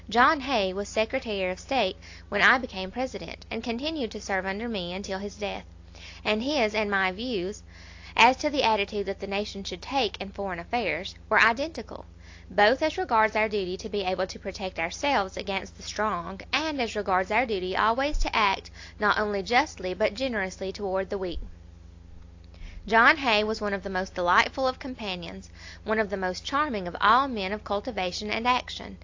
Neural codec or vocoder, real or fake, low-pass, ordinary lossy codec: none; real; 7.2 kHz; AAC, 48 kbps